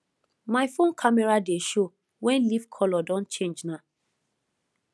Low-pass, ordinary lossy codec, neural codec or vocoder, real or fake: none; none; none; real